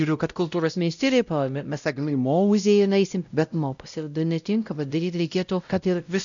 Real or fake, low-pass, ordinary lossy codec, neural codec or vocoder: fake; 7.2 kHz; MP3, 96 kbps; codec, 16 kHz, 0.5 kbps, X-Codec, WavLM features, trained on Multilingual LibriSpeech